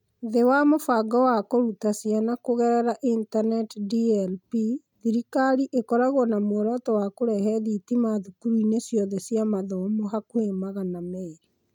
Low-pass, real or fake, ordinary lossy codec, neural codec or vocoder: 19.8 kHz; real; none; none